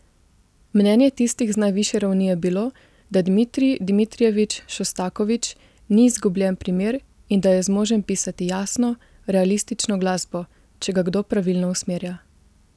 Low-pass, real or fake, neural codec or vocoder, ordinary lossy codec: none; real; none; none